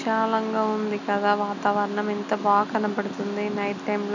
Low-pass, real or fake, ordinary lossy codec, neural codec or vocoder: 7.2 kHz; real; none; none